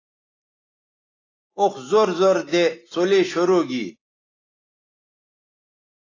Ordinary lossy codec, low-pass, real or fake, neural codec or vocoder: AAC, 32 kbps; 7.2 kHz; real; none